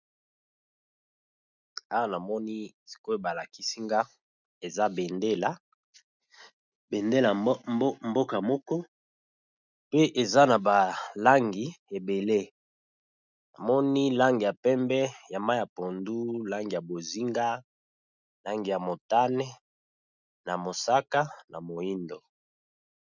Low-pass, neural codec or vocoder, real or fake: 7.2 kHz; none; real